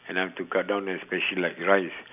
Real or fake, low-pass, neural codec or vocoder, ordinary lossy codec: real; 3.6 kHz; none; none